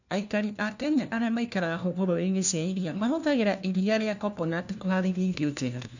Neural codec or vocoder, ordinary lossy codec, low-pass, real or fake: codec, 16 kHz, 1 kbps, FunCodec, trained on LibriTTS, 50 frames a second; none; 7.2 kHz; fake